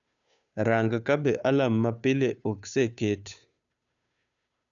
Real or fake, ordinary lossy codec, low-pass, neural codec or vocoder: fake; none; 7.2 kHz; codec, 16 kHz, 2 kbps, FunCodec, trained on Chinese and English, 25 frames a second